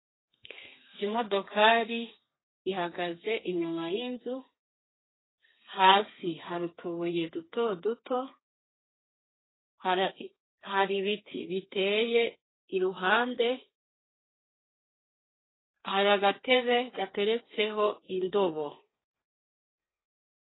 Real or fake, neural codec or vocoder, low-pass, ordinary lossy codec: fake; codec, 32 kHz, 1.9 kbps, SNAC; 7.2 kHz; AAC, 16 kbps